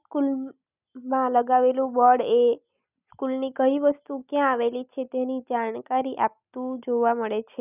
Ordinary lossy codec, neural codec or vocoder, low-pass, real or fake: none; none; 3.6 kHz; real